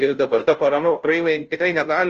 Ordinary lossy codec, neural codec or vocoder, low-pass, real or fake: Opus, 24 kbps; codec, 16 kHz, 0.5 kbps, FunCodec, trained on Chinese and English, 25 frames a second; 7.2 kHz; fake